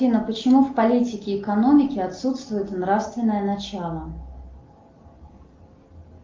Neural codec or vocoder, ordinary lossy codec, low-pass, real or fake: none; Opus, 32 kbps; 7.2 kHz; real